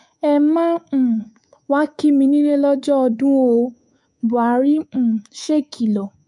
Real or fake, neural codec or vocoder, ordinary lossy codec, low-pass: fake; codec, 24 kHz, 3.1 kbps, DualCodec; MP3, 64 kbps; 10.8 kHz